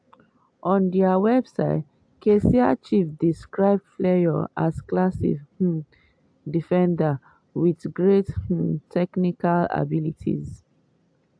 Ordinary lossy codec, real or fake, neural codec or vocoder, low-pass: AAC, 64 kbps; real; none; 9.9 kHz